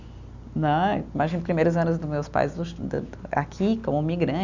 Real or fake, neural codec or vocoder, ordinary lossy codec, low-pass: real; none; none; 7.2 kHz